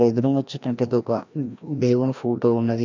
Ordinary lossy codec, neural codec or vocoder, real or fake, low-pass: MP3, 64 kbps; codec, 16 kHz, 1 kbps, FreqCodec, larger model; fake; 7.2 kHz